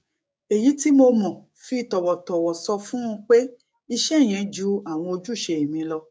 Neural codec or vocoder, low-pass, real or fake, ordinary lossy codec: codec, 16 kHz, 6 kbps, DAC; none; fake; none